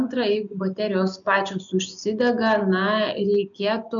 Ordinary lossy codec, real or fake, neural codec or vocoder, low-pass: MP3, 96 kbps; real; none; 7.2 kHz